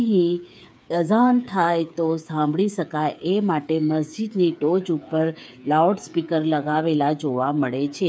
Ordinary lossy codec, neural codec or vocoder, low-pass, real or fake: none; codec, 16 kHz, 8 kbps, FreqCodec, smaller model; none; fake